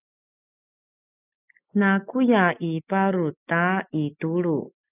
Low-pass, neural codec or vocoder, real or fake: 3.6 kHz; none; real